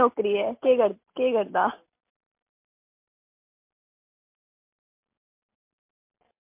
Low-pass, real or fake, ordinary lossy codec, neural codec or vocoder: 3.6 kHz; real; MP3, 32 kbps; none